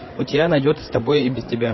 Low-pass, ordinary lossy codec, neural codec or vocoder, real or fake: 7.2 kHz; MP3, 24 kbps; codec, 16 kHz, 8 kbps, FreqCodec, larger model; fake